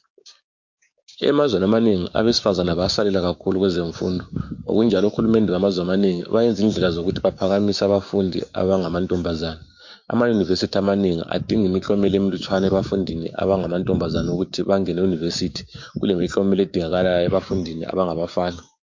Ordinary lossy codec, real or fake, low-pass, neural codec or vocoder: MP3, 48 kbps; fake; 7.2 kHz; codec, 16 kHz, 6 kbps, DAC